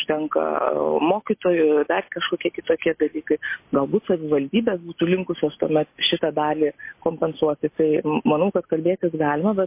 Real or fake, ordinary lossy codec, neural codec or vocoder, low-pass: real; MP3, 24 kbps; none; 3.6 kHz